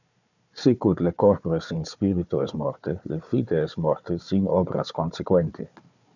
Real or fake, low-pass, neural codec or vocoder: fake; 7.2 kHz; codec, 16 kHz, 4 kbps, FunCodec, trained on Chinese and English, 50 frames a second